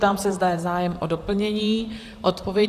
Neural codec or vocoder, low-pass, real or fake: vocoder, 44.1 kHz, 128 mel bands, Pupu-Vocoder; 14.4 kHz; fake